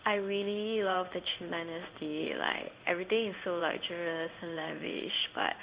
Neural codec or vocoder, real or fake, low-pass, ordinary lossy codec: codec, 16 kHz in and 24 kHz out, 1 kbps, XY-Tokenizer; fake; 3.6 kHz; Opus, 64 kbps